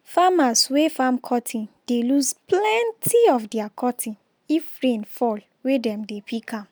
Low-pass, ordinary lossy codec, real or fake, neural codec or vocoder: none; none; real; none